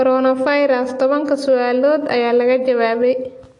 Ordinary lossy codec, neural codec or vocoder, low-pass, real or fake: AAC, 48 kbps; none; 10.8 kHz; real